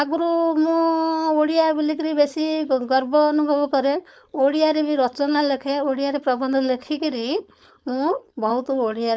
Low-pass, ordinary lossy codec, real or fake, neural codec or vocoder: none; none; fake; codec, 16 kHz, 4.8 kbps, FACodec